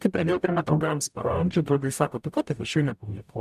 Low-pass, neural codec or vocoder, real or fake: 14.4 kHz; codec, 44.1 kHz, 0.9 kbps, DAC; fake